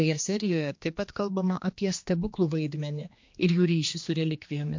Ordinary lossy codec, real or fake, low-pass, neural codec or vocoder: MP3, 48 kbps; fake; 7.2 kHz; codec, 16 kHz, 2 kbps, X-Codec, HuBERT features, trained on general audio